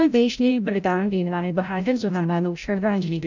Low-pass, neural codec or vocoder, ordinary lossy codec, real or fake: 7.2 kHz; codec, 16 kHz, 0.5 kbps, FreqCodec, larger model; none; fake